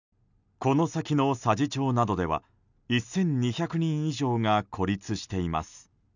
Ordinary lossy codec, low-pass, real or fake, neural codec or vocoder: none; 7.2 kHz; real; none